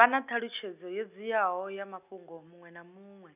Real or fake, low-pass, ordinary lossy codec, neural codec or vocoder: real; 3.6 kHz; none; none